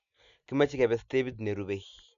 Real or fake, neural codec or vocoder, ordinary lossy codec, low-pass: real; none; none; 7.2 kHz